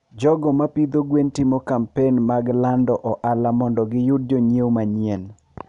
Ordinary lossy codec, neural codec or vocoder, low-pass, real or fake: none; none; 10.8 kHz; real